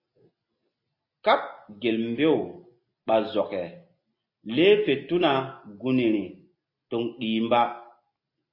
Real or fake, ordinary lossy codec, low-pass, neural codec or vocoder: real; MP3, 32 kbps; 5.4 kHz; none